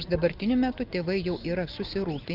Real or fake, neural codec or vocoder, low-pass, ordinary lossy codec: real; none; 5.4 kHz; Opus, 32 kbps